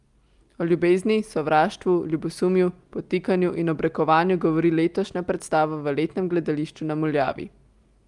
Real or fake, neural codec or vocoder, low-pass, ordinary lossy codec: real; none; 10.8 kHz; Opus, 32 kbps